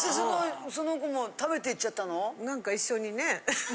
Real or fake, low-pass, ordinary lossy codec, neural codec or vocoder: real; none; none; none